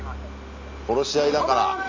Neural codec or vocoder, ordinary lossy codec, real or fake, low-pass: none; MP3, 64 kbps; real; 7.2 kHz